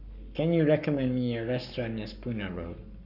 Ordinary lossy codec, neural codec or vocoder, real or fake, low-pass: none; codec, 44.1 kHz, 7.8 kbps, Pupu-Codec; fake; 5.4 kHz